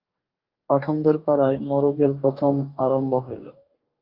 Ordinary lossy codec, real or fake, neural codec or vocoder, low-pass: Opus, 32 kbps; fake; codec, 44.1 kHz, 2.6 kbps, DAC; 5.4 kHz